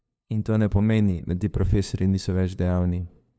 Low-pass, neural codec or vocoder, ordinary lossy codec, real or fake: none; codec, 16 kHz, 2 kbps, FunCodec, trained on LibriTTS, 25 frames a second; none; fake